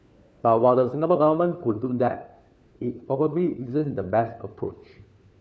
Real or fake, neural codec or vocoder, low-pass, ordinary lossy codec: fake; codec, 16 kHz, 4 kbps, FunCodec, trained on LibriTTS, 50 frames a second; none; none